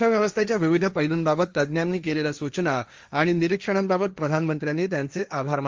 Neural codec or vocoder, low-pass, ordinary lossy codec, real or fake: codec, 16 kHz, 1.1 kbps, Voila-Tokenizer; 7.2 kHz; Opus, 32 kbps; fake